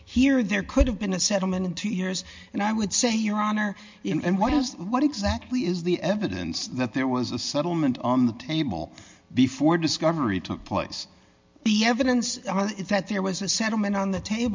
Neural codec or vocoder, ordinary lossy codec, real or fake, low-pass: none; MP3, 64 kbps; real; 7.2 kHz